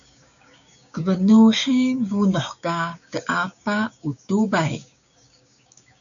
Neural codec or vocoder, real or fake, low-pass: codec, 16 kHz, 6 kbps, DAC; fake; 7.2 kHz